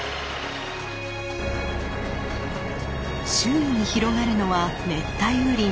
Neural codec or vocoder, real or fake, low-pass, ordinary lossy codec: none; real; none; none